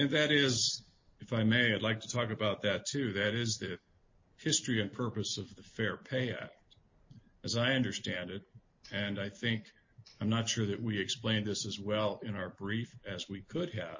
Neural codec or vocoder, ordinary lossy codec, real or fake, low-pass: none; MP3, 32 kbps; real; 7.2 kHz